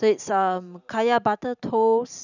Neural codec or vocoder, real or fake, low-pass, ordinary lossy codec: vocoder, 44.1 kHz, 128 mel bands every 512 samples, BigVGAN v2; fake; 7.2 kHz; none